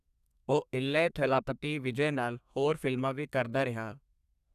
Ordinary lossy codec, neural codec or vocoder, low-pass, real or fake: none; codec, 32 kHz, 1.9 kbps, SNAC; 14.4 kHz; fake